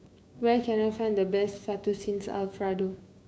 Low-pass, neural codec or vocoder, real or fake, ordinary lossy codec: none; codec, 16 kHz, 6 kbps, DAC; fake; none